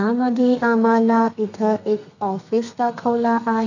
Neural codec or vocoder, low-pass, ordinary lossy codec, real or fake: codec, 32 kHz, 1.9 kbps, SNAC; 7.2 kHz; none; fake